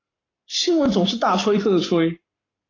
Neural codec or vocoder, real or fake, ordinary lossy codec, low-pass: codec, 44.1 kHz, 7.8 kbps, Pupu-Codec; fake; AAC, 32 kbps; 7.2 kHz